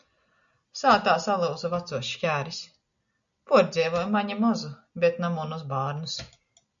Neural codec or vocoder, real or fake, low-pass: none; real; 7.2 kHz